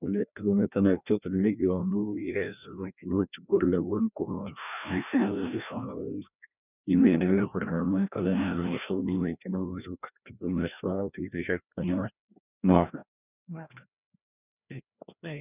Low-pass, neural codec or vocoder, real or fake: 3.6 kHz; codec, 16 kHz, 1 kbps, FreqCodec, larger model; fake